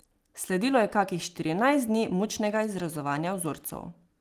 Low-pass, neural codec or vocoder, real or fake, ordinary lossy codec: 14.4 kHz; none; real; Opus, 24 kbps